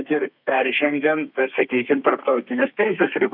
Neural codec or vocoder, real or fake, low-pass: codec, 32 kHz, 1.9 kbps, SNAC; fake; 5.4 kHz